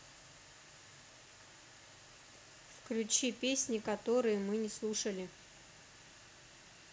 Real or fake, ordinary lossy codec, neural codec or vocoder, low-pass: real; none; none; none